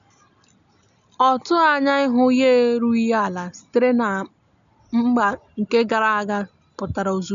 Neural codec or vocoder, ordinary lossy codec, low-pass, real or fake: none; none; 7.2 kHz; real